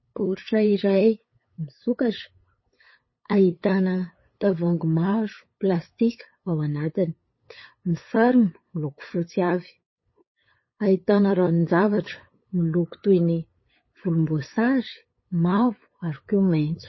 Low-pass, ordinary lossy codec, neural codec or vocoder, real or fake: 7.2 kHz; MP3, 24 kbps; codec, 16 kHz, 8 kbps, FunCodec, trained on LibriTTS, 25 frames a second; fake